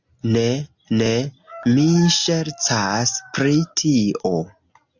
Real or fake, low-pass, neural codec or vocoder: real; 7.2 kHz; none